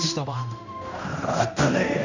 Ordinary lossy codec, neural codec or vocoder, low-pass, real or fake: none; codec, 16 kHz, 1 kbps, X-Codec, HuBERT features, trained on general audio; 7.2 kHz; fake